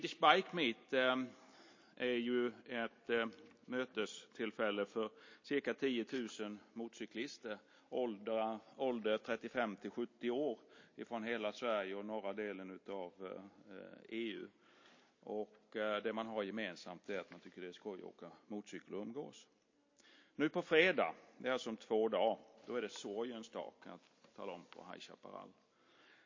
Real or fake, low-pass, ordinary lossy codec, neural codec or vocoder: real; 7.2 kHz; MP3, 32 kbps; none